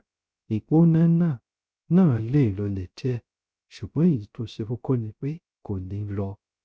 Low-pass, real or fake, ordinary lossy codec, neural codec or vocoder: none; fake; none; codec, 16 kHz, 0.3 kbps, FocalCodec